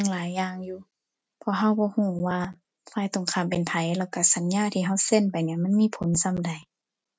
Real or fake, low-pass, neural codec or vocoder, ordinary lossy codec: real; none; none; none